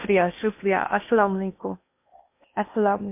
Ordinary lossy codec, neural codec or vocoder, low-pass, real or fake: MP3, 32 kbps; codec, 16 kHz in and 24 kHz out, 0.8 kbps, FocalCodec, streaming, 65536 codes; 3.6 kHz; fake